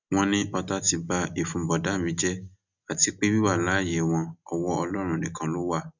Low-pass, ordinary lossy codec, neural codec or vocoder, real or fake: 7.2 kHz; none; none; real